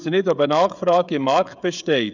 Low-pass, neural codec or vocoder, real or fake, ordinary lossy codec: 7.2 kHz; codec, 16 kHz, 16 kbps, FreqCodec, smaller model; fake; none